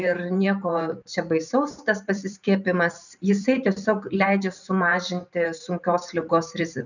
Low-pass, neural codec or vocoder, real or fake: 7.2 kHz; vocoder, 44.1 kHz, 128 mel bands every 512 samples, BigVGAN v2; fake